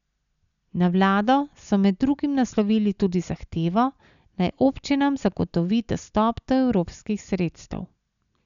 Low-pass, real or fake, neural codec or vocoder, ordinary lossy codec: 7.2 kHz; real; none; none